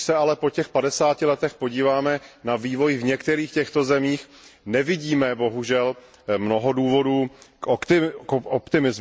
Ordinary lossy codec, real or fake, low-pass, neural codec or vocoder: none; real; none; none